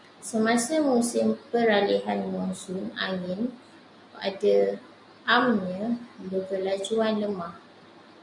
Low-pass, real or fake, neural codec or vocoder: 10.8 kHz; real; none